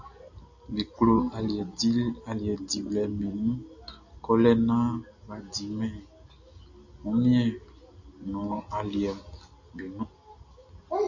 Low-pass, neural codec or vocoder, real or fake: 7.2 kHz; none; real